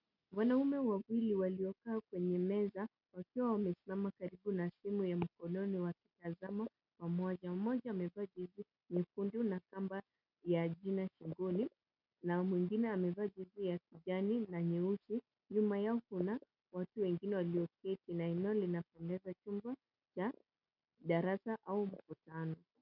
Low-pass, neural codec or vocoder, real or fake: 5.4 kHz; none; real